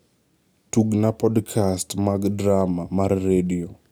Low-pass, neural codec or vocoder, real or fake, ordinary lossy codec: none; none; real; none